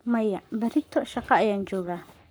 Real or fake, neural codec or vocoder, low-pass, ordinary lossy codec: fake; codec, 44.1 kHz, 7.8 kbps, Pupu-Codec; none; none